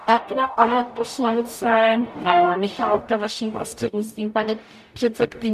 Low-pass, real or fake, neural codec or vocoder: 14.4 kHz; fake; codec, 44.1 kHz, 0.9 kbps, DAC